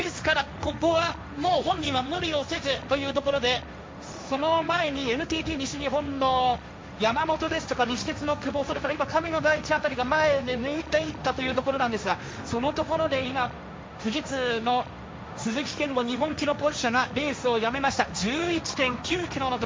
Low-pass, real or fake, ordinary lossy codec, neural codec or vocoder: none; fake; none; codec, 16 kHz, 1.1 kbps, Voila-Tokenizer